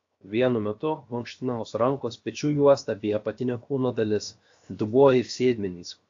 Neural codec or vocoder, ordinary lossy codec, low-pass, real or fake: codec, 16 kHz, 0.7 kbps, FocalCodec; AAC, 48 kbps; 7.2 kHz; fake